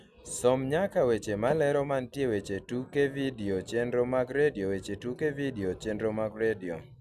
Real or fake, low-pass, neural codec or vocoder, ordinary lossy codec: real; 14.4 kHz; none; none